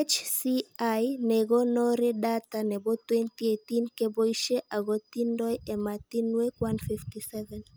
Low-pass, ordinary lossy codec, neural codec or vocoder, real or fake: none; none; none; real